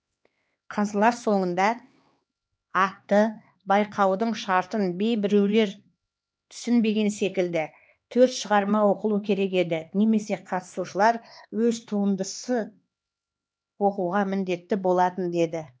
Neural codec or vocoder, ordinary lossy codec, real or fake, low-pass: codec, 16 kHz, 2 kbps, X-Codec, HuBERT features, trained on LibriSpeech; none; fake; none